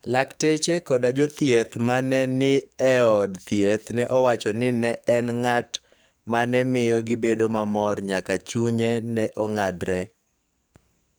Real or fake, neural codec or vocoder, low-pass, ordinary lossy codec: fake; codec, 44.1 kHz, 2.6 kbps, SNAC; none; none